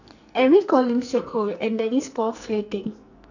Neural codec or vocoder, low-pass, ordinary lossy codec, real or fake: codec, 44.1 kHz, 2.6 kbps, SNAC; 7.2 kHz; none; fake